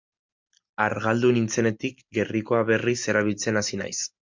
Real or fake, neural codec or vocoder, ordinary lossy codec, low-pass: real; none; MP3, 64 kbps; 7.2 kHz